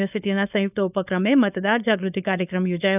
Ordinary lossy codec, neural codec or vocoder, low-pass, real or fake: none; codec, 16 kHz, 4.8 kbps, FACodec; 3.6 kHz; fake